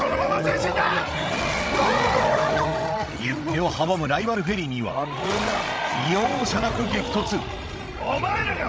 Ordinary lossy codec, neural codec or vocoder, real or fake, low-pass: none; codec, 16 kHz, 16 kbps, FreqCodec, larger model; fake; none